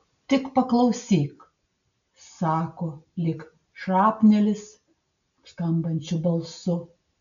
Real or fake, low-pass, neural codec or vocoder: real; 7.2 kHz; none